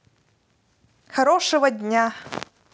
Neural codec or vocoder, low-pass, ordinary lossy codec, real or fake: none; none; none; real